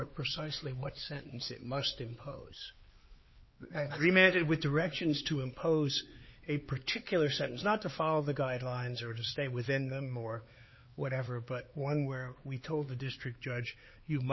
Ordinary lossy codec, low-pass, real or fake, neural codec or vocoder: MP3, 24 kbps; 7.2 kHz; fake; codec, 16 kHz, 4 kbps, X-Codec, HuBERT features, trained on LibriSpeech